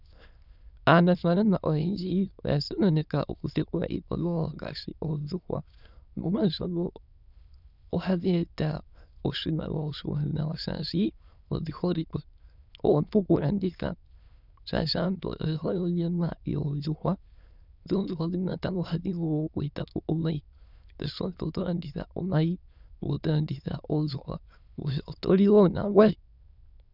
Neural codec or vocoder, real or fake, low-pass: autoencoder, 22.05 kHz, a latent of 192 numbers a frame, VITS, trained on many speakers; fake; 5.4 kHz